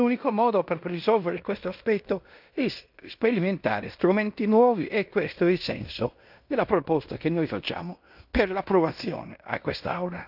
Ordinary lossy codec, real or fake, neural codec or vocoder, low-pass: none; fake; codec, 16 kHz in and 24 kHz out, 0.9 kbps, LongCat-Audio-Codec, fine tuned four codebook decoder; 5.4 kHz